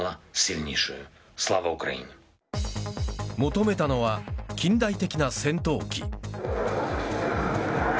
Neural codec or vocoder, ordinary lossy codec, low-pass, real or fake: none; none; none; real